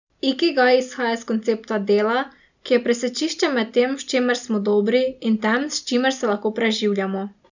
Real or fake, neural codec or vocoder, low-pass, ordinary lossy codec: real; none; 7.2 kHz; none